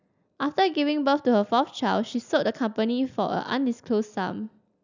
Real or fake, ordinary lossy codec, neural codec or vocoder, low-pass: real; none; none; 7.2 kHz